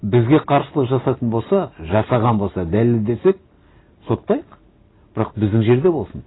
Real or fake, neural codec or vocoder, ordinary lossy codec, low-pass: real; none; AAC, 16 kbps; 7.2 kHz